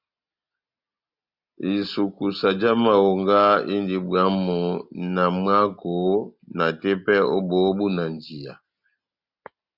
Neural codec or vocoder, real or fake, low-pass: none; real; 5.4 kHz